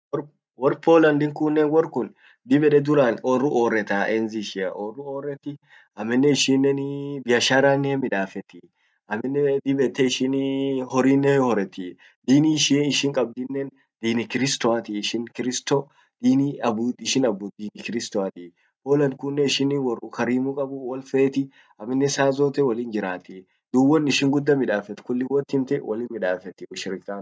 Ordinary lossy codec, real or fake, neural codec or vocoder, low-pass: none; real; none; none